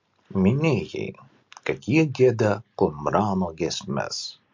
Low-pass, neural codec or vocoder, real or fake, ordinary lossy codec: 7.2 kHz; none; real; MP3, 48 kbps